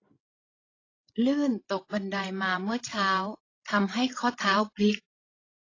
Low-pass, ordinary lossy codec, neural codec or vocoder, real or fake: 7.2 kHz; AAC, 32 kbps; codec, 16 kHz, 16 kbps, FreqCodec, larger model; fake